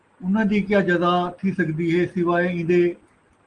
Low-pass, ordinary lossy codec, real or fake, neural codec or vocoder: 9.9 kHz; Opus, 24 kbps; real; none